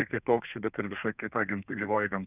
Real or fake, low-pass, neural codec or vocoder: fake; 3.6 kHz; codec, 32 kHz, 1.9 kbps, SNAC